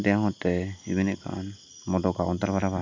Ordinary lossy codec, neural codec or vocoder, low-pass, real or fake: MP3, 64 kbps; none; 7.2 kHz; real